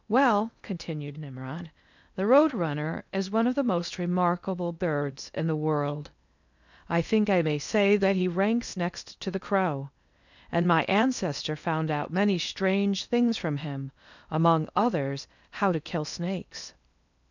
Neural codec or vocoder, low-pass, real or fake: codec, 16 kHz in and 24 kHz out, 0.8 kbps, FocalCodec, streaming, 65536 codes; 7.2 kHz; fake